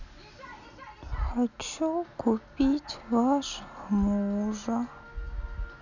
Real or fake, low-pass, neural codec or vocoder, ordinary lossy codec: real; 7.2 kHz; none; none